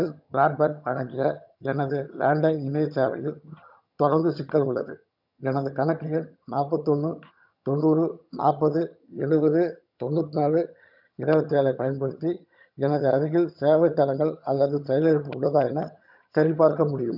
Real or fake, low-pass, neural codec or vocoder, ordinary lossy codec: fake; 5.4 kHz; vocoder, 22.05 kHz, 80 mel bands, HiFi-GAN; none